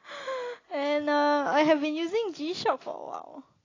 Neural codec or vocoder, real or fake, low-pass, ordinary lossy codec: none; real; 7.2 kHz; AAC, 32 kbps